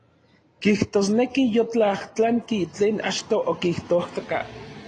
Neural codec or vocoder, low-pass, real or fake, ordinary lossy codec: none; 9.9 kHz; real; AAC, 32 kbps